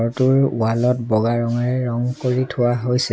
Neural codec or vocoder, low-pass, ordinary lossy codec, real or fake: none; none; none; real